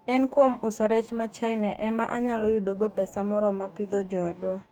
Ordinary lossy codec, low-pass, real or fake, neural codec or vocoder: none; 19.8 kHz; fake; codec, 44.1 kHz, 2.6 kbps, DAC